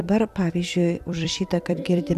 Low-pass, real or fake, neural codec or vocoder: 14.4 kHz; fake; vocoder, 44.1 kHz, 128 mel bands every 256 samples, BigVGAN v2